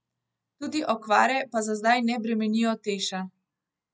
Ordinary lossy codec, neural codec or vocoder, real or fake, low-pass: none; none; real; none